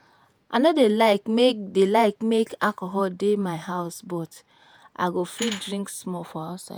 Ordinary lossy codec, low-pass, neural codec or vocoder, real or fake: none; none; vocoder, 48 kHz, 128 mel bands, Vocos; fake